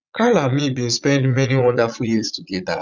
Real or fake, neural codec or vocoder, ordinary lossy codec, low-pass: fake; vocoder, 22.05 kHz, 80 mel bands, Vocos; none; 7.2 kHz